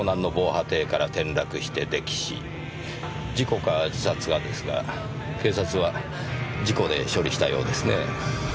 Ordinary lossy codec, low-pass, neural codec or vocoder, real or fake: none; none; none; real